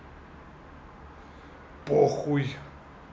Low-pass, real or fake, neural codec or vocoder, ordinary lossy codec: none; real; none; none